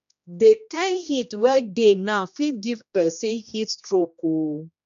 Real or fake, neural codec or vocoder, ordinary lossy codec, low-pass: fake; codec, 16 kHz, 1 kbps, X-Codec, HuBERT features, trained on general audio; MP3, 64 kbps; 7.2 kHz